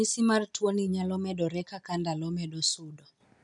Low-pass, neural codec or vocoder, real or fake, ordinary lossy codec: 10.8 kHz; none; real; none